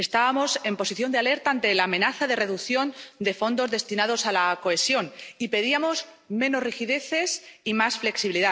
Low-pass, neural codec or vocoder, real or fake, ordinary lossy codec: none; none; real; none